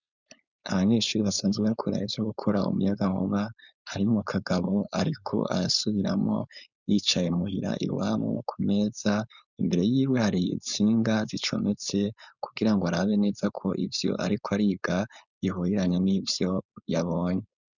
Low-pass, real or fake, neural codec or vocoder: 7.2 kHz; fake; codec, 16 kHz, 4.8 kbps, FACodec